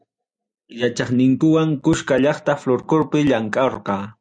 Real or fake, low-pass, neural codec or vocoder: real; 9.9 kHz; none